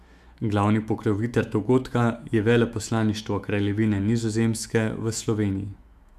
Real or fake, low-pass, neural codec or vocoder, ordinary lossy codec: fake; 14.4 kHz; autoencoder, 48 kHz, 128 numbers a frame, DAC-VAE, trained on Japanese speech; none